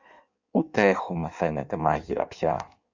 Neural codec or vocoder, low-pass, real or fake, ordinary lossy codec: codec, 16 kHz in and 24 kHz out, 1.1 kbps, FireRedTTS-2 codec; 7.2 kHz; fake; Opus, 64 kbps